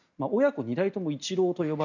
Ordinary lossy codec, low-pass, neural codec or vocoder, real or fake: none; 7.2 kHz; none; real